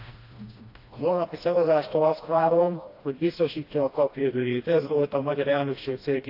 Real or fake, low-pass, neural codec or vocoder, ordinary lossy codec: fake; 5.4 kHz; codec, 16 kHz, 1 kbps, FreqCodec, smaller model; AAC, 32 kbps